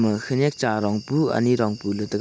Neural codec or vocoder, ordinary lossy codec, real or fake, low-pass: none; none; real; none